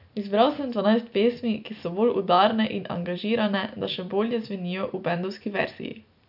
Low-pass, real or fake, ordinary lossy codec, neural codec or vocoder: 5.4 kHz; real; none; none